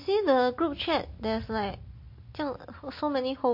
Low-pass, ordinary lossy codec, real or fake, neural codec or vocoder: 5.4 kHz; MP3, 32 kbps; real; none